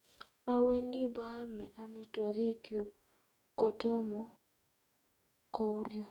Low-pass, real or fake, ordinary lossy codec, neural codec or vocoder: 19.8 kHz; fake; none; codec, 44.1 kHz, 2.6 kbps, DAC